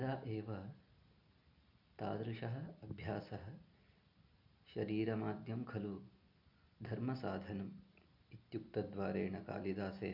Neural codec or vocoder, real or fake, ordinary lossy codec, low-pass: none; real; none; 5.4 kHz